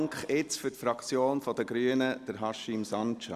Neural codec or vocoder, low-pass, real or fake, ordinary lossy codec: none; 14.4 kHz; real; Opus, 64 kbps